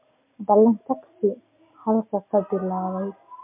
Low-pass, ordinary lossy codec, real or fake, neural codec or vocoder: 3.6 kHz; MP3, 32 kbps; real; none